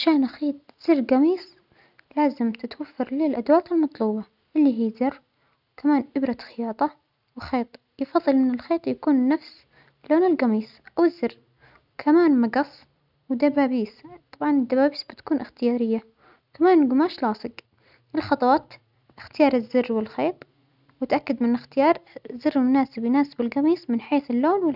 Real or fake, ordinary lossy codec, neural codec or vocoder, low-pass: real; none; none; 5.4 kHz